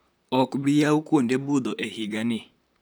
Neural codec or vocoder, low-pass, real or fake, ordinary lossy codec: vocoder, 44.1 kHz, 128 mel bands, Pupu-Vocoder; none; fake; none